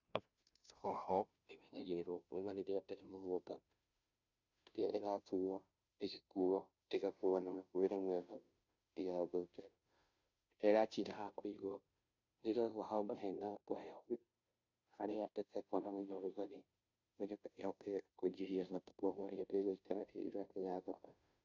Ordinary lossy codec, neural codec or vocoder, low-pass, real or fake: none; codec, 16 kHz, 0.5 kbps, FunCodec, trained on Chinese and English, 25 frames a second; 7.2 kHz; fake